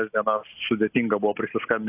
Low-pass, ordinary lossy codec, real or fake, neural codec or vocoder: 3.6 kHz; AAC, 32 kbps; real; none